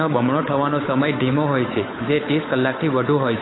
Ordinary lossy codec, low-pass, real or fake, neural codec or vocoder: AAC, 16 kbps; 7.2 kHz; fake; codec, 16 kHz, 8 kbps, FunCodec, trained on Chinese and English, 25 frames a second